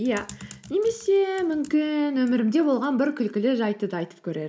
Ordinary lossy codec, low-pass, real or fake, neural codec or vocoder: none; none; real; none